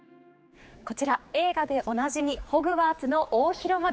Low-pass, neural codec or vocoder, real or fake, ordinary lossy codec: none; codec, 16 kHz, 4 kbps, X-Codec, HuBERT features, trained on general audio; fake; none